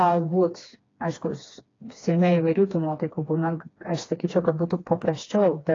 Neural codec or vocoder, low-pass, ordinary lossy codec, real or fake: codec, 16 kHz, 2 kbps, FreqCodec, smaller model; 7.2 kHz; AAC, 32 kbps; fake